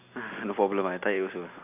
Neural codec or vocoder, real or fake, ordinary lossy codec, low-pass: none; real; none; 3.6 kHz